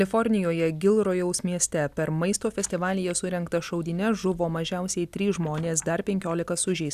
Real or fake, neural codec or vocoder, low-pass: real; none; 14.4 kHz